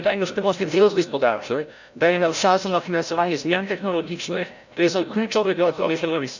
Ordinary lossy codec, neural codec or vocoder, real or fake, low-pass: none; codec, 16 kHz, 0.5 kbps, FreqCodec, larger model; fake; 7.2 kHz